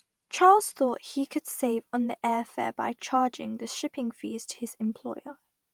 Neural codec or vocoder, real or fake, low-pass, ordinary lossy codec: vocoder, 48 kHz, 128 mel bands, Vocos; fake; 19.8 kHz; Opus, 32 kbps